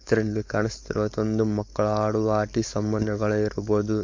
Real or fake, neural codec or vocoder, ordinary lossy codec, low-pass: fake; codec, 16 kHz, 4.8 kbps, FACodec; MP3, 48 kbps; 7.2 kHz